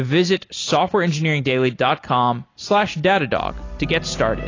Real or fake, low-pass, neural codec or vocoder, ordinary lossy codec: real; 7.2 kHz; none; AAC, 32 kbps